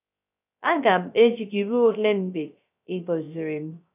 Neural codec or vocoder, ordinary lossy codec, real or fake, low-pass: codec, 16 kHz, 0.3 kbps, FocalCodec; none; fake; 3.6 kHz